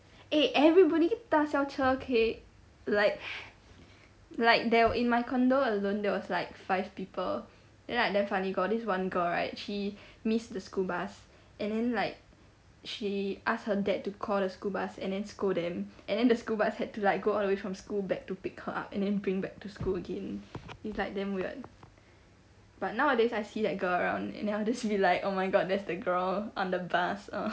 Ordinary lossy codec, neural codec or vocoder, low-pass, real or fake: none; none; none; real